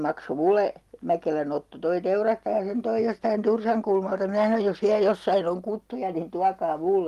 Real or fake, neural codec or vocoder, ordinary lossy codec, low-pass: real; none; Opus, 32 kbps; 14.4 kHz